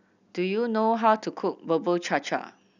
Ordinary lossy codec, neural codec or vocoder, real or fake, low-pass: none; none; real; 7.2 kHz